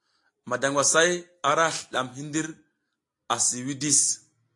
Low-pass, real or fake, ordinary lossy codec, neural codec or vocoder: 10.8 kHz; real; AAC, 48 kbps; none